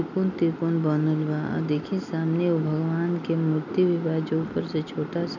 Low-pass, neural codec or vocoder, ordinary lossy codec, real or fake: 7.2 kHz; none; none; real